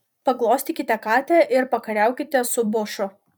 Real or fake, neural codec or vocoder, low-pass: fake; vocoder, 44.1 kHz, 128 mel bands every 256 samples, BigVGAN v2; 19.8 kHz